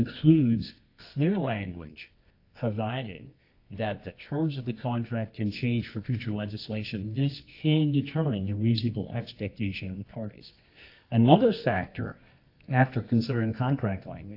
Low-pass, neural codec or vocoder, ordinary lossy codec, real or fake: 5.4 kHz; codec, 24 kHz, 0.9 kbps, WavTokenizer, medium music audio release; AAC, 32 kbps; fake